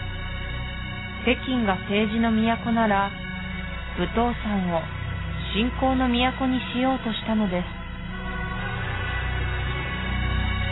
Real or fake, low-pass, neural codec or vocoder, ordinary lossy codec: real; 7.2 kHz; none; AAC, 16 kbps